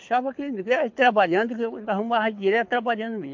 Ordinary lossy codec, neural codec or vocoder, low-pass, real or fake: MP3, 48 kbps; codec, 24 kHz, 6 kbps, HILCodec; 7.2 kHz; fake